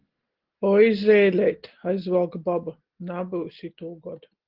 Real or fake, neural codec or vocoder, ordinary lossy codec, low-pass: real; none; Opus, 16 kbps; 5.4 kHz